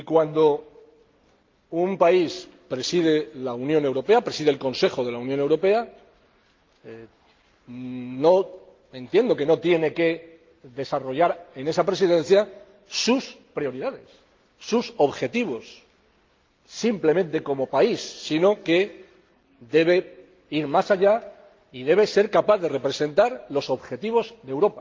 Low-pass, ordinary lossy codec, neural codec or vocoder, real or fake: 7.2 kHz; Opus, 24 kbps; none; real